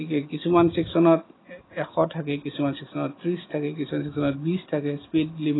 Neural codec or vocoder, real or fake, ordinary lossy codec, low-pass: none; real; AAC, 16 kbps; 7.2 kHz